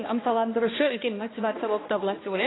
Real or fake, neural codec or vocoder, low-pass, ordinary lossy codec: fake; codec, 16 kHz, 1 kbps, X-Codec, HuBERT features, trained on balanced general audio; 7.2 kHz; AAC, 16 kbps